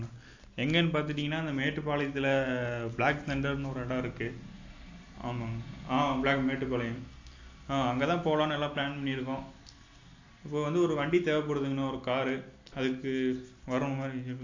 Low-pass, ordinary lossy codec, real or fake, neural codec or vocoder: 7.2 kHz; AAC, 48 kbps; real; none